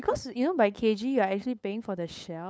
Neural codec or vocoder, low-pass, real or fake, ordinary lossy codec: codec, 16 kHz, 8 kbps, FunCodec, trained on LibriTTS, 25 frames a second; none; fake; none